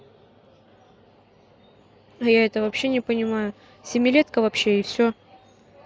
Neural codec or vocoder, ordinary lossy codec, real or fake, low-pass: none; none; real; none